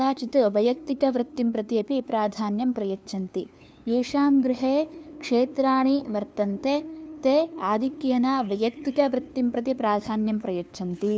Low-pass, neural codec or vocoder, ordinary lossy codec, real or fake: none; codec, 16 kHz, 2 kbps, FunCodec, trained on LibriTTS, 25 frames a second; none; fake